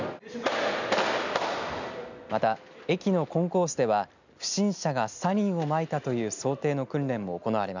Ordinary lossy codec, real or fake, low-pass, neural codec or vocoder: none; real; 7.2 kHz; none